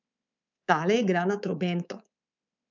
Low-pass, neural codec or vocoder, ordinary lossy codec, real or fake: 7.2 kHz; codec, 24 kHz, 3.1 kbps, DualCodec; none; fake